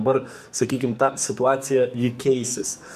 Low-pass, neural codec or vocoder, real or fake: 14.4 kHz; codec, 44.1 kHz, 2.6 kbps, SNAC; fake